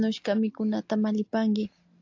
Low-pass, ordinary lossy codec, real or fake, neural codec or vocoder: 7.2 kHz; AAC, 48 kbps; real; none